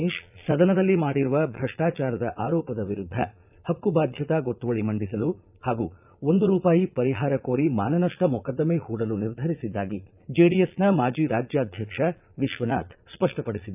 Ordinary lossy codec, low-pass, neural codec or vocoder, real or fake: none; 3.6 kHz; vocoder, 22.05 kHz, 80 mel bands, Vocos; fake